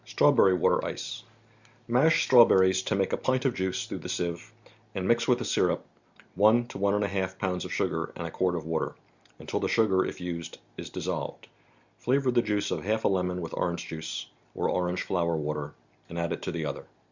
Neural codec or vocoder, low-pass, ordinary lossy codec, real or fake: none; 7.2 kHz; Opus, 64 kbps; real